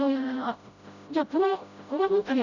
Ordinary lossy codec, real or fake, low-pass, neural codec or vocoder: none; fake; 7.2 kHz; codec, 16 kHz, 0.5 kbps, FreqCodec, smaller model